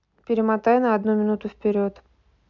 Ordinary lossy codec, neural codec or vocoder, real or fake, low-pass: MP3, 64 kbps; none; real; 7.2 kHz